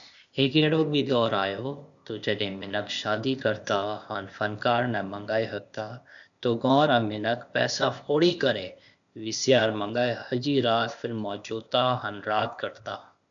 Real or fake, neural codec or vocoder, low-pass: fake; codec, 16 kHz, 0.8 kbps, ZipCodec; 7.2 kHz